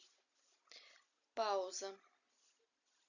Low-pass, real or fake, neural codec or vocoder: 7.2 kHz; real; none